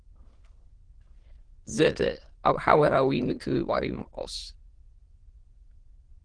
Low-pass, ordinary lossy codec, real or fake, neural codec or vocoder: 9.9 kHz; Opus, 16 kbps; fake; autoencoder, 22.05 kHz, a latent of 192 numbers a frame, VITS, trained on many speakers